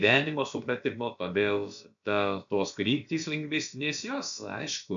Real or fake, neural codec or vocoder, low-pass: fake; codec, 16 kHz, about 1 kbps, DyCAST, with the encoder's durations; 7.2 kHz